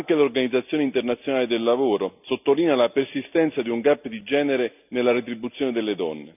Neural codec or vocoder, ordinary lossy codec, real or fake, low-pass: none; none; real; 3.6 kHz